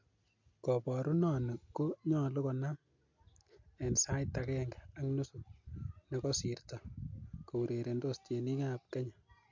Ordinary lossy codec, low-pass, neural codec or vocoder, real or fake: MP3, 48 kbps; 7.2 kHz; none; real